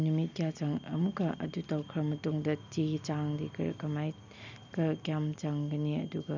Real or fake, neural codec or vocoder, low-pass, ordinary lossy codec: real; none; 7.2 kHz; none